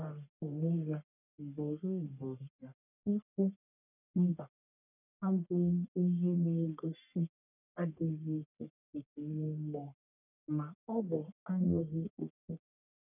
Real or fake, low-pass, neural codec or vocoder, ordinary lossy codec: fake; 3.6 kHz; codec, 44.1 kHz, 1.7 kbps, Pupu-Codec; none